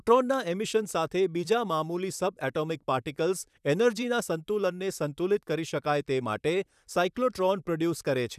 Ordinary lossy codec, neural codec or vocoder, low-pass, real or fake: none; vocoder, 44.1 kHz, 128 mel bands, Pupu-Vocoder; 14.4 kHz; fake